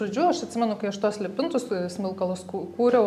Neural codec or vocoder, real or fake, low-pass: none; real; 14.4 kHz